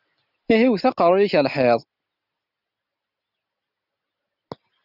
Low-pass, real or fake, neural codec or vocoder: 5.4 kHz; real; none